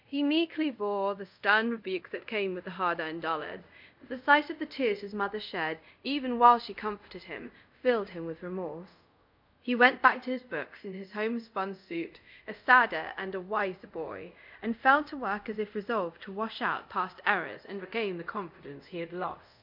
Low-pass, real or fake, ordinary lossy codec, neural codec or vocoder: 5.4 kHz; fake; MP3, 48 kbps; codec, 24 kHz, 0.5 kbps, DualCodec